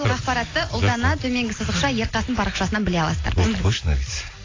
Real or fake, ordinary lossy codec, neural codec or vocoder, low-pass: real; AAC, 32 kbps; none; 7.2 kHz